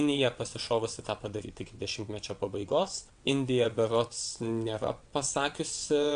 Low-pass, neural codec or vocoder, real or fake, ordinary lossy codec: 9.9 kHz; vocoder, 22.05 kHz, 80 mel bands, WaveNeXt; fake; AAC, 64 kbps